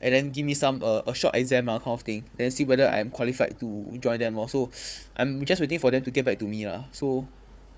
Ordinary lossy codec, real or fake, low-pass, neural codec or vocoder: none; fake; none; codec, 16 kHz, 4 kbps, FunCodec, trained on Chinese and English, 50 frames a second